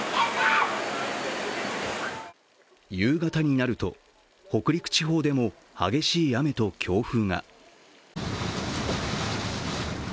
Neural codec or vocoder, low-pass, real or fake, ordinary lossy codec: none; none; real; none